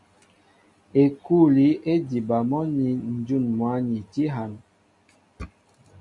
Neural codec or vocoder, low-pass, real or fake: none; 10.8 kHz; real